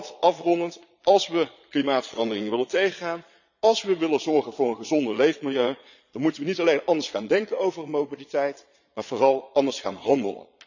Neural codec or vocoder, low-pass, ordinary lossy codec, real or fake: vocoder, 22.05 kHz, 80 mel bands, Vocos; 7.2 kHz; none; fake